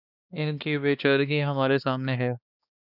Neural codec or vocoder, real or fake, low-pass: codec, 16 kHz, 2 kbps, X-Codec, HuBERT features, trained on balanced general audio; fake; 5.4 kHz